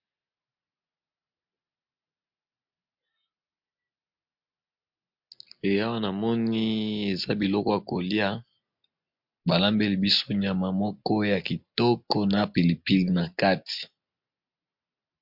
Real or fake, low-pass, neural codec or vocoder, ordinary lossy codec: real; 5.4 kHz; none; MP3, 48 kbps